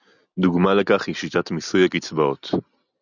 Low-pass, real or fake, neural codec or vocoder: 7.2 kHz; real; none